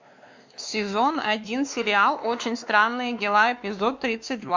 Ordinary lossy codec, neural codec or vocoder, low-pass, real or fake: MP3, 64 kbps; codec, 16 kHz, 2 kbps, X-Codec, WavLM features, trained on Multilingual LibriSpeech; 7.2 kHz; fake